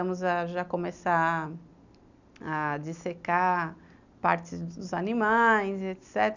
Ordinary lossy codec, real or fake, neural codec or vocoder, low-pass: none; real; none; 7.2 kHz